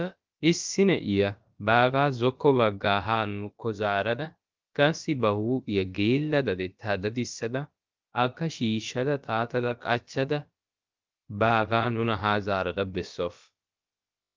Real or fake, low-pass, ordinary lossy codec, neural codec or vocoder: fake; 7.2 kHz; Opus, 32 kbps; codec, 16 kHz, about 1 kbps, DyCAST, with the encoder's durations